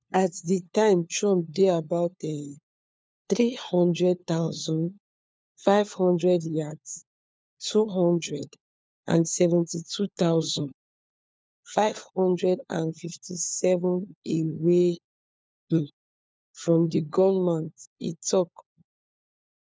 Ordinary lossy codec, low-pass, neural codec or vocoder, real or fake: none; none; codec, 16 kHz, 4 kbps, FunCodec, trained on LibriTTS, 50 frames a second; fake